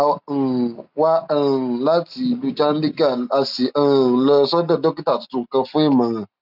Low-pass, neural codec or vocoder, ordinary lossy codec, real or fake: 5.4 kHz; none; none; real